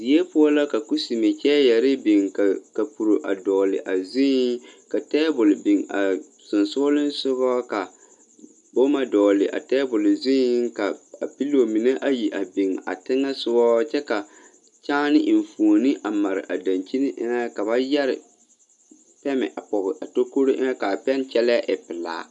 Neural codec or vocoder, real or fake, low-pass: none; real; 10.8 kHz